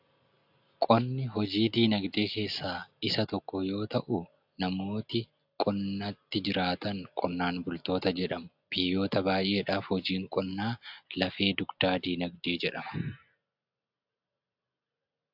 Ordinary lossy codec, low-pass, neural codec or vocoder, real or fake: AAC, 48 kbps; 5.4 kHz; none; real